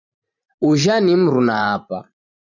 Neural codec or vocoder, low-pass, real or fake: vocoder, 44.1 kHz, 128 mel bands every 512 samples, BigVGAN v2; 7.2 kHz; fake